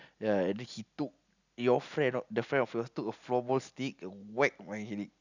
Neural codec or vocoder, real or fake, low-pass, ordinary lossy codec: none; real; 7.2 kHz; none